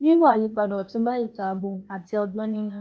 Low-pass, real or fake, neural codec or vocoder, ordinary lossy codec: none; fake; codec, 16 kHz, 0.8 kbps, ZipCodec; none